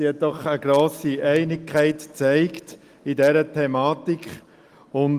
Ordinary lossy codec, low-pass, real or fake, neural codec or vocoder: Opus, 24 kbps; 14.4 kHz; real; none